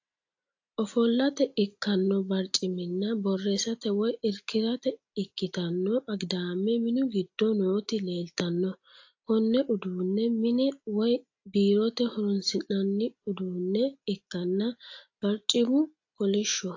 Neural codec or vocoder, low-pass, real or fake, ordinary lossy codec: none; 7.2 kHz; real; AAC, 48 kbps